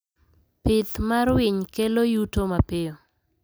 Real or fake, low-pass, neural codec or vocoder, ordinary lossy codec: real; none; none; none